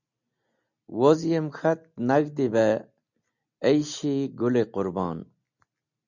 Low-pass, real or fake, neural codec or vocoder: 7.2 kHz; real; none